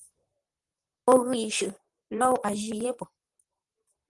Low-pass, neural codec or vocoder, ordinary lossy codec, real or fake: 10.8 kHz; vocoder, 44.1 kHz, 128 mel bands, Pupu-Vocoder; Opus, 24 kbps; fake